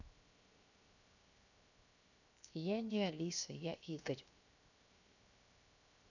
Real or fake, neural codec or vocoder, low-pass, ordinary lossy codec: fake; codec, 16 kHz, 0.7 kbps, FocalCodec; 7.2 kHz; none